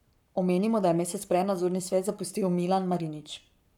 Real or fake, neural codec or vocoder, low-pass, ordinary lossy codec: fake; codec, 44.1 kHz, 7.8 kbps, Pupu-Codec; 19.8 kHz; none